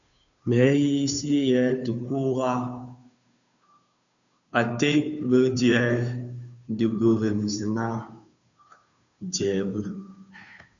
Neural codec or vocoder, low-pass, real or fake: codec, 16 kHz, 2 kbps, FunCodec, trained on Chinese and English, 25 frames a second; 7.2 kHz; fake